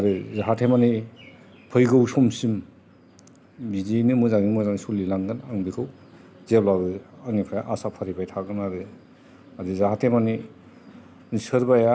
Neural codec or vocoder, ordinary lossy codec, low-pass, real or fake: none; none; none; real